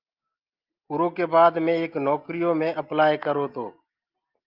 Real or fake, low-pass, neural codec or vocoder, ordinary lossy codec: real; 5.4 kHz; none; Opus, 24 kbps